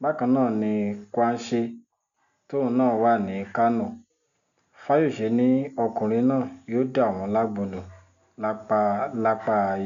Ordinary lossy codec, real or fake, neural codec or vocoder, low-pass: none; real; none; 7.2 kHz